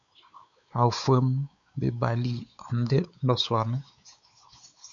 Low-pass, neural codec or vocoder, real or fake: 7.2 kHz; codec, 16 kHz, 4 kbps, X-Codec, WavLM features, trained on Multilingual LibriSpeech; fake